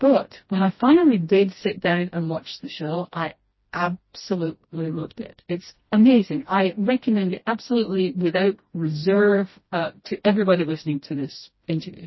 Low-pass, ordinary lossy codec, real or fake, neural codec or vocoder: 7.2 kHz; MP3, 24 kbps; fake; codec, 16 kHz, 1 kbps, FreqCodec, smaller model